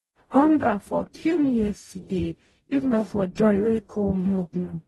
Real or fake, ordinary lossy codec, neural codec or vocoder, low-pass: fake; AAC, 32 kbps; codec, 44.1 kHz, 0.9 kbps, DAC; 19.8 kHz